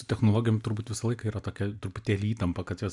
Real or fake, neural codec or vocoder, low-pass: real; none; 10.8 kHz